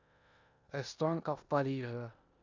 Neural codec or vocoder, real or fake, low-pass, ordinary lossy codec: codec, 16 kHz in and 24 kHz out, 0.9 kbps, LongCat-Audio-Codec, four codebook decoder; fake; 7.2 kHz; Opus, 64 kbps